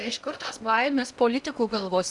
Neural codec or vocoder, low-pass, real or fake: codec, 16 kHz in and 24 kHz out, 0.8 kbps, FocalCodec, streaming, 65536 codes; 10.8 kHz; fake